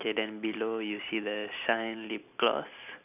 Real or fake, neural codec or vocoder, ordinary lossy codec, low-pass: real; none; none; 3.6 kHz